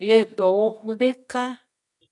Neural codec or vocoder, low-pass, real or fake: codec, 24 kHz, 0.9 kbps, WavTokenizer, medium music audio release; 10.8 kHz; fake